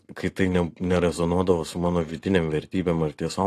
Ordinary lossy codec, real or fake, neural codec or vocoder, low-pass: AAC, 48 kbps; fake; vocoder, 44.1 kHz, 128 mel bands every 512 samples, BigVGAN v2; 14.4 kHz